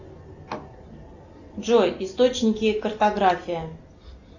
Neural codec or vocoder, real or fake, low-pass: none; real; 7.2 kHz